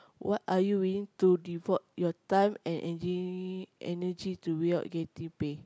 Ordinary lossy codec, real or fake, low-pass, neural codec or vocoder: none; real; none; none